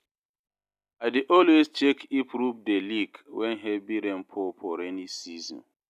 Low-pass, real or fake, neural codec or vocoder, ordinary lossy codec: 14.4 kHz; real; none; none